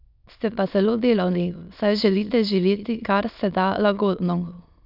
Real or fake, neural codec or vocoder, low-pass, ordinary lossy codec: fake; autoencoder, 22.05 kHz, a latent of 192 numbers a frame, VITS, trained on many speakers; 5.4 kHz; none